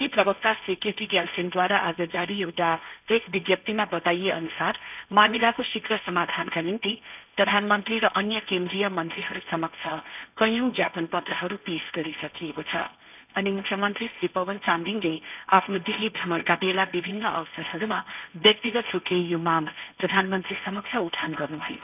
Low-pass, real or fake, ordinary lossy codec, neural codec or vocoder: 3.6 kHz; fake; none; codec, 16 kHz, 1.1 kbps, Voila-Tokenizer